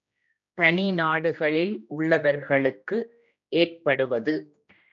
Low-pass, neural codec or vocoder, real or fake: 7.2 kHz; codec, 16 kHz, 1 kbps, X-Codec, HuBERT features, trained on general audio; fake